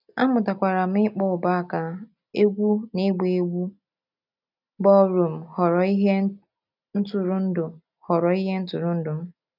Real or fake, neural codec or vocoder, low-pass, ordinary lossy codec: real; none; 5.4 kHz; none